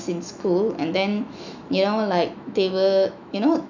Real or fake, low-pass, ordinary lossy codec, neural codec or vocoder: fake; 7.2 kHz; none; autoencoder, 48 kHz, 128 numbers a frame, DAC-VAE, trained on Japanese speech